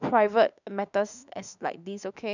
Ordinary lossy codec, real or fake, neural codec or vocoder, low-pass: none; fake; autoencoder, 48 kHz, 32 numbers a frame, DAC-VAE, trained on Japanese speech; 7.2 kHz